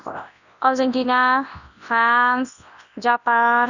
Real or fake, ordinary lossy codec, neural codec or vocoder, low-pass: fake; none; codec, 24 kHz, 0.9 kbps, WavTokenizer, large speech release; 7.2 kHz